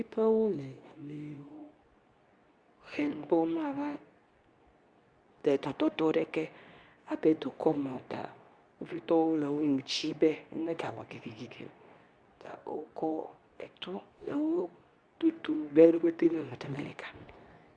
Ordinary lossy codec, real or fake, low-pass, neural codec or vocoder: Opus, 64 kbps; fake; 9.9 kHz; codec, 24 kHz, 0.9 kbps, WavTokenizer, medium speech release version 2